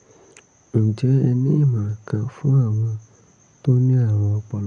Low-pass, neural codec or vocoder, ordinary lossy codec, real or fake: 9.9 kHz; none; none; real